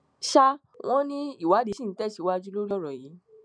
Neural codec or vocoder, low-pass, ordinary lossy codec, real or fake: none; 9.9 kHz; none; real